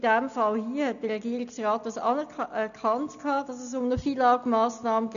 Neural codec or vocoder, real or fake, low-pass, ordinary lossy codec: none; real; 7.2 kHz; none